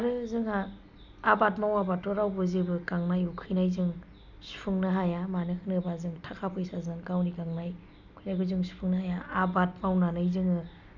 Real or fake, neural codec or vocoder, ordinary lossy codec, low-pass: real; none; none; 7.2 kHz